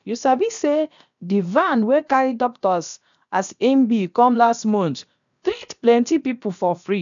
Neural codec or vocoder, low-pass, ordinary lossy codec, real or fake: codec, 16 kHz, about 1 kbps, DyCAST, with the encoder's durations; 7.2 kHz; none; fake